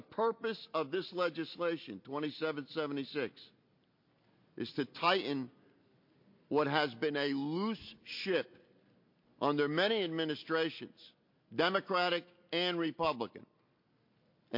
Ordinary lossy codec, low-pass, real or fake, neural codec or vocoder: MP3, 32 kbps; 5.4 kHz; real; none